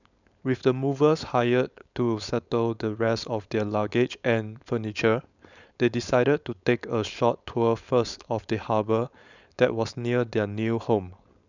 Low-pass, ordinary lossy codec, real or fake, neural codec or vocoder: 7.2 kHz; none; fake; codec, 16 kHz, 4.8 kbps, FACodec